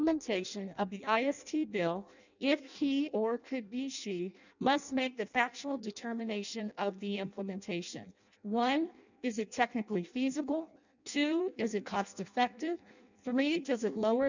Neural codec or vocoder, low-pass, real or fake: codec, 16 kHz in and 24 kHz out, 0.6 kbps, FireRedTTS-2 codec; 7.2 kHz; fake